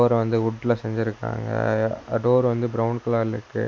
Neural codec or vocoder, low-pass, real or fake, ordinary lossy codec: none; none; real; none